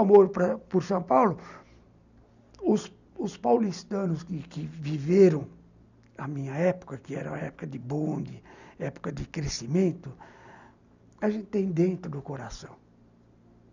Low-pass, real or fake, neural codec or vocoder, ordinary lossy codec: 7.2 kHz; real; none; none